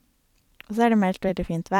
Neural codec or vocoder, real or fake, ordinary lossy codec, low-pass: vocoder, 44.1 kHz, 128 mel bands every 256 samples, BigVGAN v2; fake; none; 19.8 kHz